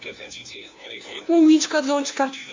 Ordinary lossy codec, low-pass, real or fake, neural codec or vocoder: AAC, 48 kbps; 7.2 kHz; fake; codec, 16 kHz, 1 kbps, FunCodec, trained on LibriTTS, 50 frames a second